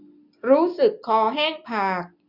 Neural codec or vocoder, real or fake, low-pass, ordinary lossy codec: none; real; 5.4 kHz; none